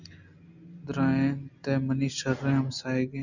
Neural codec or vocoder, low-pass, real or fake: none; 7.2 kHz; real